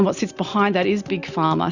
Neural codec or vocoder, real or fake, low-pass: none; real; 7.2 kHz